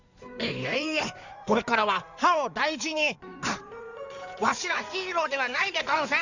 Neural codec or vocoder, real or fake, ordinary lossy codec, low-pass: codec, 16 kHz in and 24 kHz out, 2.2 kbps, FireRedTTS-2 codec; fake; none; 7.2 kHz